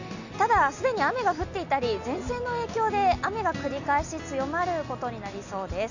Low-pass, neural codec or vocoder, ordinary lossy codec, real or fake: 7.2 kHz; none; none; real